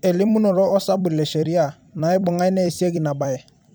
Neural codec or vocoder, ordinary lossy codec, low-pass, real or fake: vocoder, 44.1 kHz, 128 mel bands every 256 samples, BigVGAN v2; none; none; fake